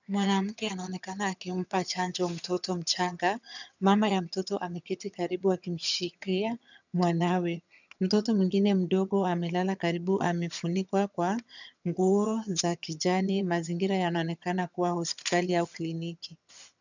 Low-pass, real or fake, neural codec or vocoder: 7.2 kHz; fake; vocoder, 22.05 kHz, 80 mel bands, HiFi-GAN